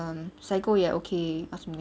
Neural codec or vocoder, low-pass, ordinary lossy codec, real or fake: none; none; none; real